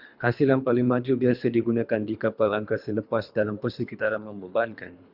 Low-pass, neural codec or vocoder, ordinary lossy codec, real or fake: 5.4 kHz; codec, 24 kHz, 3 kbps, HILCodec; Opus, 64 kbps; fake